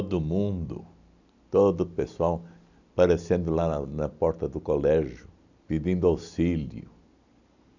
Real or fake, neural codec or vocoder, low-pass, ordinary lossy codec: real; none; 7.2 kHz; none